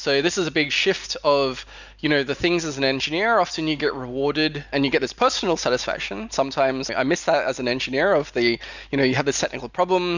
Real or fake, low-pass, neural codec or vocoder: real; 7.2 kHz; none